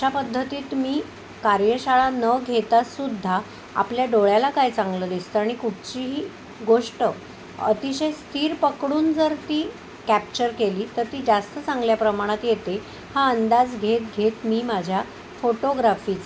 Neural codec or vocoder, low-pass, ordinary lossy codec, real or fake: none; none; none; real